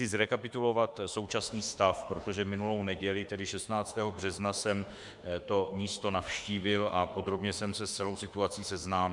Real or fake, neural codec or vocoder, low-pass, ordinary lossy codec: fake; autoencoder, 48 kHz, 32 numbers a frame, DAC-VAE, trained on Japanese speech; 10.8 kHz; MP3, 96 kbps